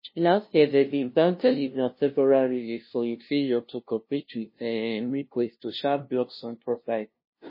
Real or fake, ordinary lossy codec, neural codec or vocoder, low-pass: fake; MP3, 24 kbps; codec, 16 kHz, 0.5 kbps, FunCodec, trained on LibriTTS, 25 frames a second; 5.4 kHz